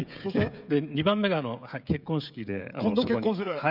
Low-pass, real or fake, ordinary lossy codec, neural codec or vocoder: 5.4 kHz; fake; none; codec, 16 kHz, 16 kbps, FreqCodec, smaller model